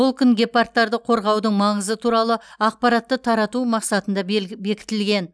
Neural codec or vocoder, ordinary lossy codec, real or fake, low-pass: none; none; real; none